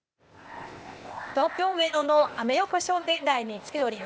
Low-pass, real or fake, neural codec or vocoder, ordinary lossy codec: none; fake; codec, 16 kHz, 0.8 kbps, ZipCodec; none